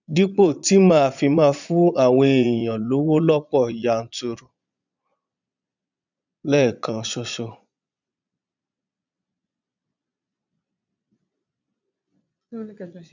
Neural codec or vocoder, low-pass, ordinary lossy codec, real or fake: vocoder, 22.05 kHz, 80 mel bands, Vocos; 7.2 kHz; none; fake